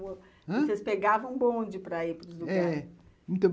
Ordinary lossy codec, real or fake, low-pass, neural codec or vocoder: none; real; none; none